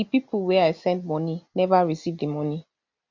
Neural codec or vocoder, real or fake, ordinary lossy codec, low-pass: none; real; MP3, 48 kbps; 7.2 kHz